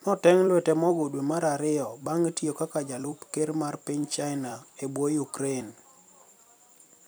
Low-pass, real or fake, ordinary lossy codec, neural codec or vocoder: none; fake; none; vocoder, 44.1 kHz, 128 mel bands every 256 samples, BigVGAN v2